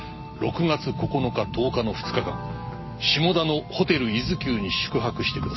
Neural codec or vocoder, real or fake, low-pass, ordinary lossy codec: none; real; 7.2 kHz; MP3, 24 kbps